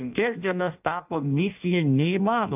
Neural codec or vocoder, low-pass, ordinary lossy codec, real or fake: codec, 16 kHz in and 24 kHz out, 0.6 kbps, FireRedTTS-2 codec; 3.6 kHz; AAC, 32 kbps; fake